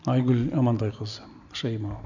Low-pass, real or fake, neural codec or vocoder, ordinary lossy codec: 7.2 kHz; real; none; none